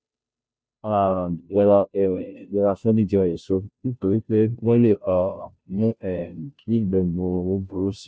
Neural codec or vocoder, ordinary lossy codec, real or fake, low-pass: codec, 16 kHz, 0.5 kbps, FunCodec, trained on Chinese and English, 25 frames a second; none; fake; none